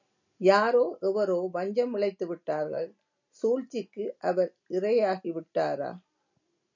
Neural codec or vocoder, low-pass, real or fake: none; 7.2 kHz; real